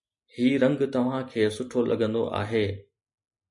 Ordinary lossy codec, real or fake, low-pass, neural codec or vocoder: MP3, 48 kbps; real; 10.8 kHz; none